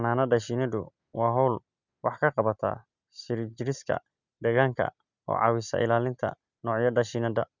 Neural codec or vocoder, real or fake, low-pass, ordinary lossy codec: none; real; 7.2 kHz; Opus, 64 kbps